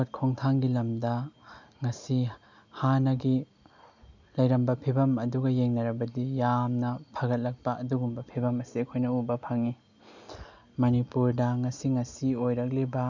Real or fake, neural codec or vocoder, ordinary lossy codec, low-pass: real; none; none; 7.2 kHz